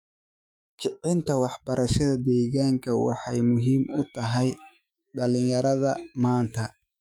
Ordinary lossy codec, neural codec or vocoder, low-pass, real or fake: none; autoencoder, 48 kHz, 128 numbers a frame, DAC-VAE, trained on Japanese speech; 19.8 kHz; fake